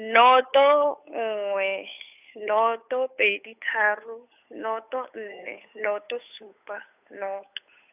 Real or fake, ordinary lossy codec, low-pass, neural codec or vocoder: fake; none; 3.6 kHz; codec, 16 kHz, 8 kbps, FunCodec, trained on Chinese and English, 25 frames a second